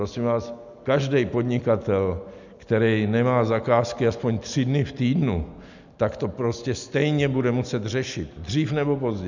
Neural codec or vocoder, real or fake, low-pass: none; real; 7.2 kHz